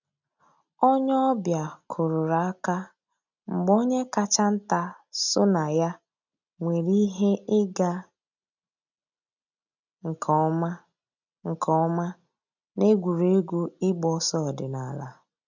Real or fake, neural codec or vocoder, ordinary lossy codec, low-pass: real; none; none; 7.2 kHz